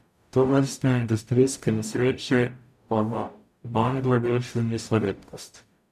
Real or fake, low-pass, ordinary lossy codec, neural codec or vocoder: fake; 14.4 kHz; none; codec, 44.1 kHz, 0.9 kbps, DAC